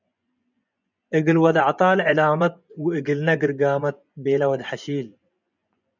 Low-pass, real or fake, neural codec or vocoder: 7.2 kHz; fake; vocoder, 24 kHz, 100 mel bands, Vocos